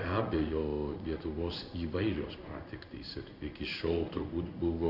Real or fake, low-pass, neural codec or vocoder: fake; 5.4 kHz; codec, 16 kHz in and 24 kHz out, 1 kbps, XY-Tokenizer